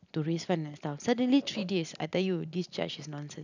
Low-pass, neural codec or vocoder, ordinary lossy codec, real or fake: 7.2 kHz; none; none; real